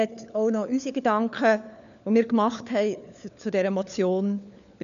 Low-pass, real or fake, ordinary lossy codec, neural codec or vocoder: 7.2 kHz; fake; none; codec, 16 kHz, 4 kbps, FunCodec, trained on LibriTTS, 50 frames a second